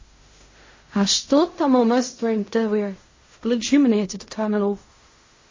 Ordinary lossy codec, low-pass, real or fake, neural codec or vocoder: MP3, 32 kbps; 7.2 kHz; fake; codec, 16 kHz in and 24 kHz out, 0.4 kbps, LongCat-Audio-Codec, fine tuned four codebook decoder